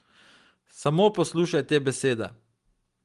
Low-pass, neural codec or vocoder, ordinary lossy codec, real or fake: 10.8 kHz; none; Opus, 24 kbps; real